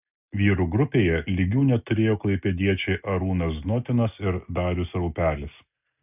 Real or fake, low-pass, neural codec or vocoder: real; 3.6 kHz; none